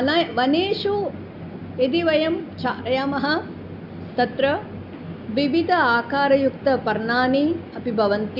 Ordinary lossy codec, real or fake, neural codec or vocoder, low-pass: none; real; none; 5.4 kHz